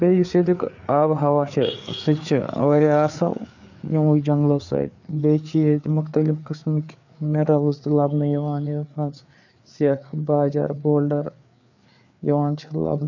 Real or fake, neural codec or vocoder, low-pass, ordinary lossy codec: fake; codec, 16 kHz, 4 kbps, FreqCodec, larger model; 7.2 kHz; none